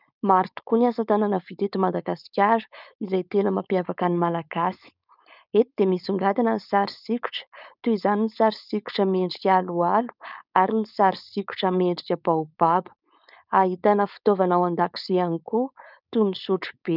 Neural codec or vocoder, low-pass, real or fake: codec, 16 kHz, 4.8 kbps, FACodec; 5.4 kHz; fake